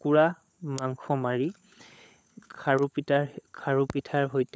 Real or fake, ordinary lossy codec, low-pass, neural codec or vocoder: fake; none; none; codec, 16 kHz, 16 kbps, FunCodec, trained on LibriTTS, 50 frames a second